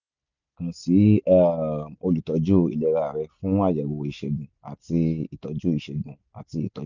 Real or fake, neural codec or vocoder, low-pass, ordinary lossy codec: real; none; 7.2 kHz; none